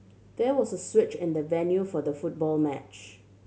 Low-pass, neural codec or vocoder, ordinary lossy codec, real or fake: none; none; none; real